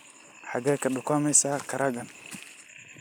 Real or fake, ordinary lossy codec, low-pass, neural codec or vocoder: real; none; none; none